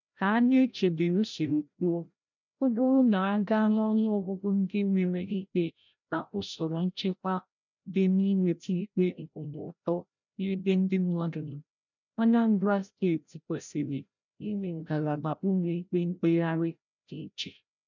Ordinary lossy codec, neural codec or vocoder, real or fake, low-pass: none; codec, 16 kHz, 0.5 kbps, FreqCodec, larger model; fake; 7.2 kHz